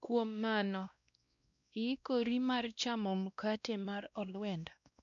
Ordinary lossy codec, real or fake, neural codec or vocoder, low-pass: none; fake; codec, 16 kHz, 1 kbps, X-Codec, WavLM features, trained on Multilingual LibriSpeech; 7.2 kHz